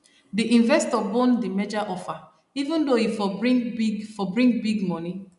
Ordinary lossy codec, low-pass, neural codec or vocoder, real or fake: none; 10.8 kHz; none; real